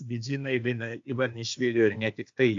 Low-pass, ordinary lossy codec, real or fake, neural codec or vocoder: 7.2 kHz; AAC, 48 kbps; fake; codec, 16 kHz, 0.8 kbps, ZipCodec